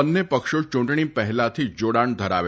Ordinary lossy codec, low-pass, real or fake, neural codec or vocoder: none; none; real; none